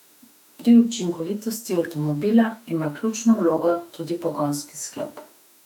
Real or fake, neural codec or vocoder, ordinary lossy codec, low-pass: fake; autoencoder, 48 kHz, 32 numbers a frame, DAC-VAE, trained on Japanese speech; none; 19.8 kHz